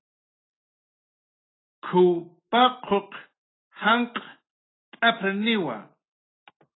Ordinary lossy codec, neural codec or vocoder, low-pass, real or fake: AAC, 16 kbps; none; 7.2 kHz; real